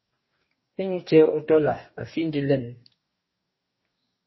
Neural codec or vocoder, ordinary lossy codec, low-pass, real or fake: codec, 44.1 kHz, 2.6 kbps, DAC; MP3, 24 kbps; 7.2 kHz; fake